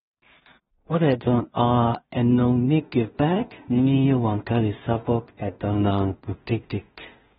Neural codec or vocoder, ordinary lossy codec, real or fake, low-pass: codec, 16 kHz in and 24 kHz out, 0.4 kbps, LongCat-Audio-Codec, two codebook decoder; AAC, 16 kbps; fake; 10.8 kHz